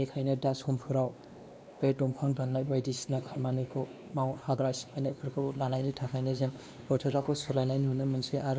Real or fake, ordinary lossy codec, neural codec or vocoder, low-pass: fake; none; codec, 16 kHz, 2 kbps, X-Codec, WavLM features, trained on Multilingual LibriSpeech; none